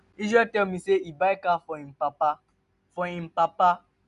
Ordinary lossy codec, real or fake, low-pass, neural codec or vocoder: none; real; 10.8 kHz; none